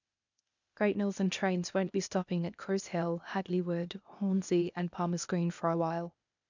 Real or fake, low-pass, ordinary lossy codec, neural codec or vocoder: fake; 7.2 kHz; none; codec, 16 kHz, 0.8 kbps, ZipCodec